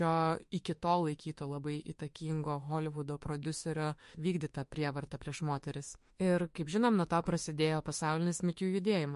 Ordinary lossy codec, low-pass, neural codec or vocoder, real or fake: MP3, 48 kbps; 14.4 kHz; autoencoder, 48 kHz, 32 numbers a frame, DAC-VAE, trained on Japanese speech; fake